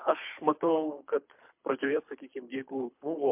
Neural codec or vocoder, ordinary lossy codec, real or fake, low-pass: codec, 24 kHz, 3 kbps, HILCodec; MP3, 32 kbps; fake; 3.6 kHz